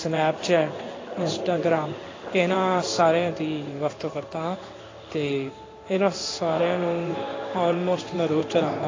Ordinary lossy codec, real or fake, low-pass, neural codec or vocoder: AAC, 32 kbps; fake; 7.2 kHz; codec, 16 kHz in and 24 kHz out, 1 kbps, XY-Tokenizer